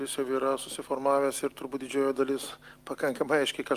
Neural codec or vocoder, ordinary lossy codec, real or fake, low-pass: none; Opus, 32 kbps; real; 14.4 kHz